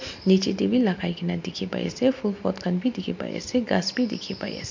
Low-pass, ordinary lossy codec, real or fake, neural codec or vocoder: 7.2 kHz; MP3, 48 kbps; real; none